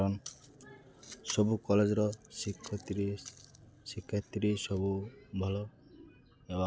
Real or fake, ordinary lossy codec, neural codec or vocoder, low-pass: real; none; none; none